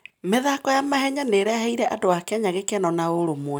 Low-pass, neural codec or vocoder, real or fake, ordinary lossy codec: none; none; real; none